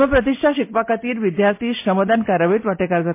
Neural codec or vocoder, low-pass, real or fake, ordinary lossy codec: none; 3.6 kHz; real; MP3, 24 kbps